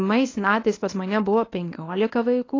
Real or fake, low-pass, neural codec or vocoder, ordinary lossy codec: fake; 7.2 kHz; codec, 24 kHz, 0.9 kbps, WavTokenizer, medium speech release version 1; AAC, 32 kbps